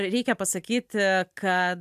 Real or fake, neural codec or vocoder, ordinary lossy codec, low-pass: real; none; AAC, 96 kbps; 14.4 kHz